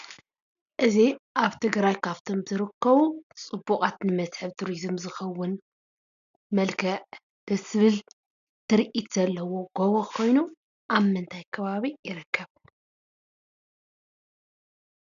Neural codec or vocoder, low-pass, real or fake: none; 7.2 kHz; real